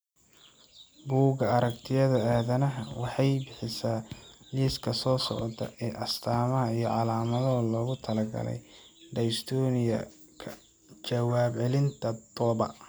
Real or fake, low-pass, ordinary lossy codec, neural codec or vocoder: real; none; none; none